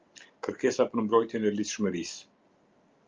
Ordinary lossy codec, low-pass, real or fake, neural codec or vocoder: Opus, 24 kbps; 7.2 kHz; real; none